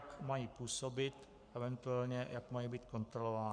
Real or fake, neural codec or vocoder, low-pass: fake; codec, 44.1 kHz, 7.8 kbps, Pupu-Codec; 9.9 kHz